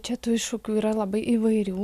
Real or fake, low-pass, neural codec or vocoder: real; 14.4 kHz; none